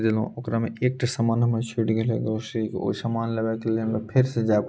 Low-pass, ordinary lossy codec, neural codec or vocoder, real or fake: none; none; none; real